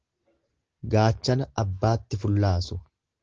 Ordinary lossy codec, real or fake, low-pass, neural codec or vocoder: Opus, 16 kbps; real; 7.2 kHz; none